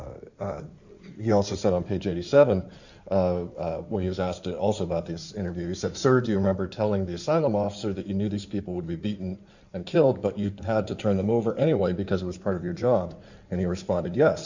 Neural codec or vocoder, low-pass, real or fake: codec, 16 kHz in and 24 kHz out, 2.2 kbps, FireRedTTS-2 codec; 7.2 kHz; fake